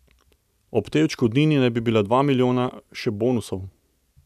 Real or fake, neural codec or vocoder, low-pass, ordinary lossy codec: real; none; 14.4 kHz; none